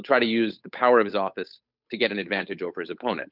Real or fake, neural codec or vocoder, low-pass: fake; codec, 16 kHz, 8 kbps, FunCodec, trained on Chinese and English, 25 frames a second; 5.4 kHz